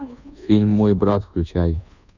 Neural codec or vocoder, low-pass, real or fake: codec, 24 kHz, 1.2 kbps, DualCodec; 7.2 kHz; fake